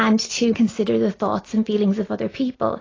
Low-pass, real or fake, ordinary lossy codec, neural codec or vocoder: 7.2 kHz; real; AAC, 32 kbps; none